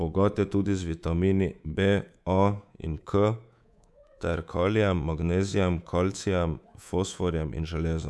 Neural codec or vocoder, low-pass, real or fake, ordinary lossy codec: codec, 24 kHz, 3.1 kbps, DualCodec; none; fake; none